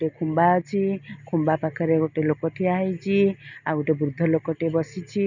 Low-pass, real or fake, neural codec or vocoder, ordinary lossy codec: 7.2 kHz; real; none; none